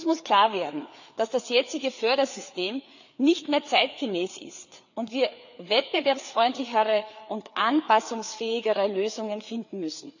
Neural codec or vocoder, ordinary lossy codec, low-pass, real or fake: codec, 16 kHz, 4 kbps, FreqCodec, larger model; none; 7.2 kHz; fake